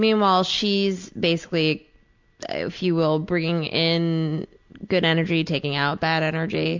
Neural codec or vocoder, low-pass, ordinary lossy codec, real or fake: none; 7.2 kHz; MP3, 64 kbps; real